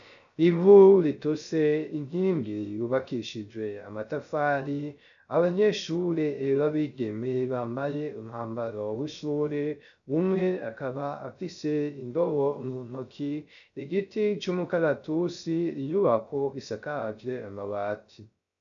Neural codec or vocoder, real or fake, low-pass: codec, 16 kHz, 0.3 kbps, FocalCodec; fake; 7.2 kHz